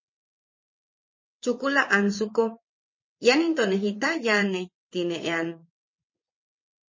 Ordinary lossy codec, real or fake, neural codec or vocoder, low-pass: MP3, 32 kbps; fake; vocoder, 22.05 kHz, 80 mel bands, WaveNeXt; 7.2 kHz